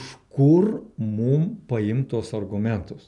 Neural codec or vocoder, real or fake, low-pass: none; real; 10.8 kHz